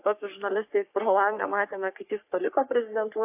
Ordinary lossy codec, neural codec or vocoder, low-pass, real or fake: AAC, 32 kbps; codec, 44.1 kHz, 3.4 kbps, Pupu-Codec; 3.6 kHz; fake